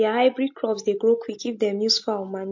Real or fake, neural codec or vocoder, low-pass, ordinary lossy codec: real; none; 7.2 kHz; MP3, 48 kbps